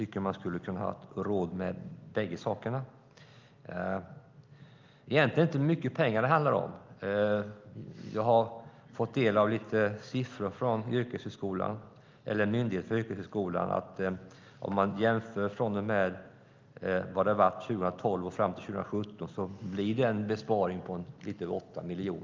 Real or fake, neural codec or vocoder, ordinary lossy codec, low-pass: real; none; Opus, 24 kbps; 7.2 kHz